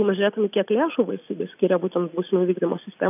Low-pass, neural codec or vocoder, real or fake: 3.6 kHz; none; real